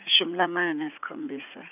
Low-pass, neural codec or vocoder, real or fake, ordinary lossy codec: 3.6 kHz; vocoder, 44.1 kHz, 80 mel bands, Vocos; fake; none